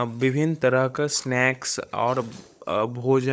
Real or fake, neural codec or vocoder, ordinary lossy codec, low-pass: fake; codec, 16 kHz, 16 kbps, FunCodec, trained on LibriTTS, 50 frames a second; none; none